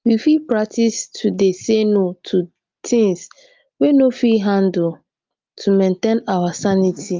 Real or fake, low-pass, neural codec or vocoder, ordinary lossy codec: real; 7.2 kHz; none; Opus, 24 kbps